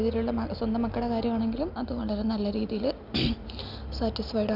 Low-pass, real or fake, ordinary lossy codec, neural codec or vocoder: 5.4 kHz; real; none; none